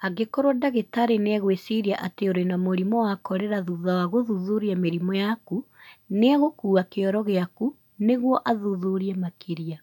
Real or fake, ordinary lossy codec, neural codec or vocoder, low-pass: real; none; none; 19.8 kHz